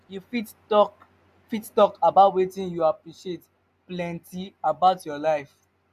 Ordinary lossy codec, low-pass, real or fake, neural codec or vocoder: none; 14.4 kHz; real; none